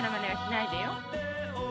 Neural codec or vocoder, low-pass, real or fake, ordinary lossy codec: none; none; real; none